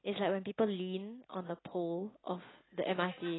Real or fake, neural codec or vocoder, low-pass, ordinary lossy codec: real; none; 7.2 kHz; AAC, 16 kbps